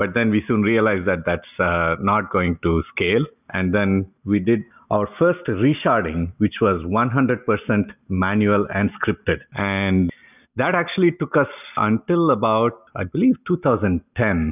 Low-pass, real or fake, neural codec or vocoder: 3.6 kHz; real; none